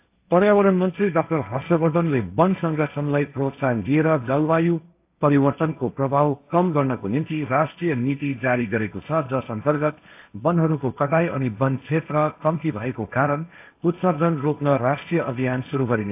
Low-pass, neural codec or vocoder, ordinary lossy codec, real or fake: 3.6 kHz; codec, 16 kHz, 1.1 kbps, Voila-Tokenizer; AAC, 32 kbps; fake